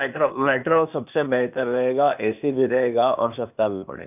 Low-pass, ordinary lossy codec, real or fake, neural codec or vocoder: 3.6 kHz; none; fake; codec, 16 kHz, 0.8 kbps, ZipCodec